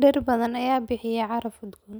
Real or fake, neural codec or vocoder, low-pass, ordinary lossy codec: real; none; none; none